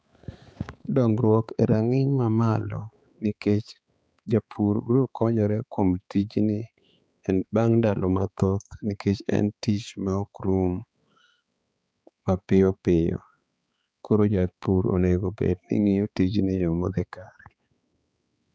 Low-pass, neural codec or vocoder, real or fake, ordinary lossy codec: none; codec, 16 kHz, 4 kbps, X-Codec, HuBERT features, trained on balanced general audio; fake; none